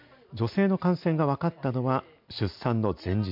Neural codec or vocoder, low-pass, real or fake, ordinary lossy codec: none; 5.4 kHz; real; MP3, 48 kbps